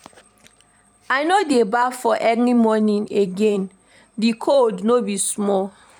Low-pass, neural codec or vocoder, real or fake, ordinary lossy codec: 19.8 kHz; vocoder, 44.1 kHz, 128 mel bands every 512 samples, BigVGAN v2; fake; none